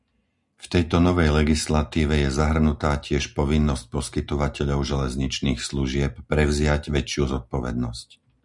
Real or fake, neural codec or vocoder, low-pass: real; none; 10.8 kHz